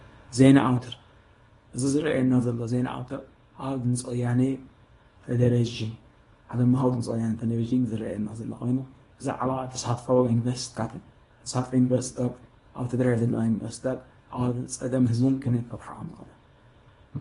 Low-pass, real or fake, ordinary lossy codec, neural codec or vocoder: 10.8 kHz; fake; AAC, 32 kbps; codec, 24 kHz, 0.9 kbps, WavTokenizer, small release